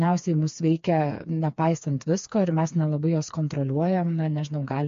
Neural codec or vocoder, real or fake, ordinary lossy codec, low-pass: codec, 16 kHz, 4 kbps, FreqCodec, smaller model; fake; MP3, 48 kbps; 7.2 kHz